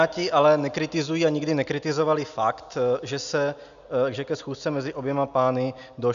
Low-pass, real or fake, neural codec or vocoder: 7.2 kHz; real; none